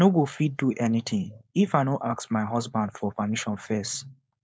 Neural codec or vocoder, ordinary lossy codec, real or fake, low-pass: codec, 16 kHz, 4.8 kbps, FACodec; none; fake; none